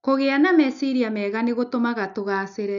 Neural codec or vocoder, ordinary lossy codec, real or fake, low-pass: none; MP3, 96 kbps; real; 7.2 kHz